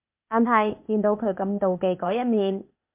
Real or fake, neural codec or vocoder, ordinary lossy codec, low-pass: fake; codec, 16 kHz, 0.8 kbps, ZipCodec; MP3, 32 kbps; 3.6 kHz